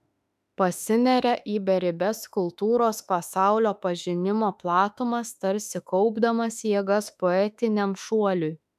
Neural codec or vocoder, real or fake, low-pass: autoencoder, 48 kHz, 32 numbers a frame, DAC-VAE, trained on Japanese speech; fake; 14.4 kHz